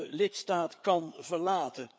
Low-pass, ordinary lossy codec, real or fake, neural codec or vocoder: none; none; fake; codec, 16 kHz, 4 kbps, FreqCodec, larger model